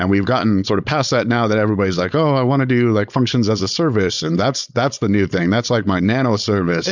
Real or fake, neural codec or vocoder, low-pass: fake; codec, 16 kHz, 4.8 kbps, FACodec; 7.2 kHz